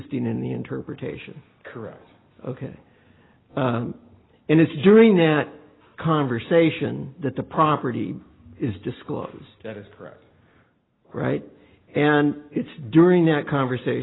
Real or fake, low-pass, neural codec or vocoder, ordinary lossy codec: real; 7.2 kHz; none; AAC, 16 kbps